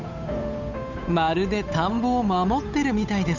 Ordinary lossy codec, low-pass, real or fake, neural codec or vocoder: none; 7.2 kHz; fake; codec, 16 kHz, 8 kbps, FunCodec, trained on Chinese and English, 25 frames a second